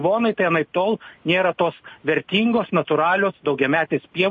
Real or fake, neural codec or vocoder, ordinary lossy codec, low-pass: real; none; MP3, 32 kbps; 7.2 kHz